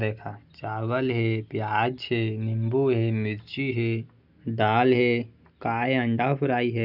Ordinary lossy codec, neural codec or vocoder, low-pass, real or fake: none; none; 5.4 kHz; real